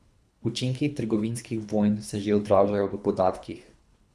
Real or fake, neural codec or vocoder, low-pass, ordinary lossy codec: fake; codec, 24 kHz, 3 kbps, HILCodec; none; none